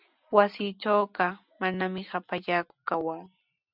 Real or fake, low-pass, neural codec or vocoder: real; 5.4 kHz; none